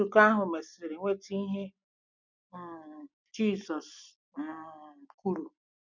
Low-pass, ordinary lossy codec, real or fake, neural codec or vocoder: 7.2 kHz; none; real; none